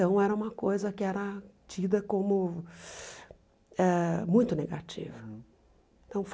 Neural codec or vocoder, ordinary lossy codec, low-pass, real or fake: none; none; none; real